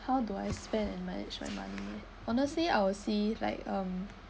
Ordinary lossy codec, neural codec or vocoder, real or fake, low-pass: none; none; real; none